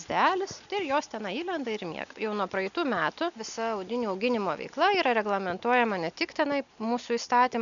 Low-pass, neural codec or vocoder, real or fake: 7.2 kHz; none; real